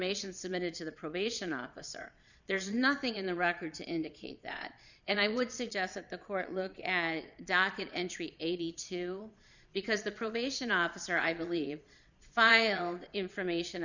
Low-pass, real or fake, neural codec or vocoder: 7.2 kHz; fake; vocoder, 22.05 kHz, 80 mel bands, Vocos